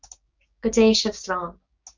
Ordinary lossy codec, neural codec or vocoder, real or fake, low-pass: Opus, 64 kbps; codec, 16 kHz, 6 kbps, DAC; fake; 7.2 kHz